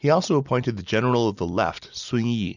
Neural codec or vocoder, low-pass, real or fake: none; 7.2 kHz; real